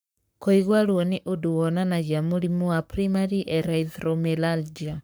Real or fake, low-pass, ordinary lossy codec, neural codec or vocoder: fake; none; none; codec, 44.1 kHz, 7.8 kbps, Pupu-Codec